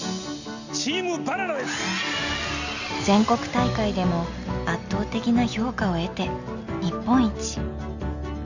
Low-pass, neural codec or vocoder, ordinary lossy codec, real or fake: 7.2 kHz; none; Opus, 64 kbps; real